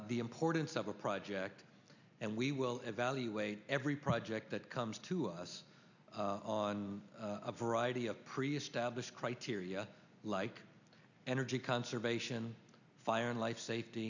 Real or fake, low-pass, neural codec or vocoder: real; 7.2 kHz; none